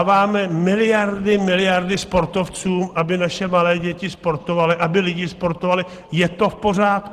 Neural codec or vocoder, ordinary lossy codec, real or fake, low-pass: none; Opus, 16 kbps; real; 14.4 kHz